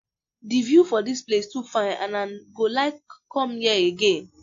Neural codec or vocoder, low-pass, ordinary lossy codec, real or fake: none; 7.2 kHz; AAC, 48 kbps; real